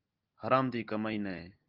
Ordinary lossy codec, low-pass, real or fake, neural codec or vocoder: Opus, 32 kbps; 5.4 kHz; real; none